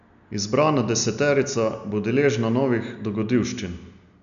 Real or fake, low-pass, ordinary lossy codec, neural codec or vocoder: real; 7.2 kHz; none; none